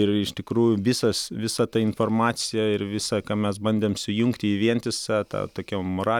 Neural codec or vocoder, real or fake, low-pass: none; real; 19.8 kHz